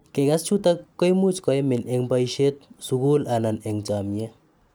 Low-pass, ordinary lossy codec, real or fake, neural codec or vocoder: none; none; real; none